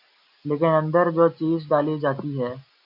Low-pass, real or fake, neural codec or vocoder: 5.4 kHz; real; none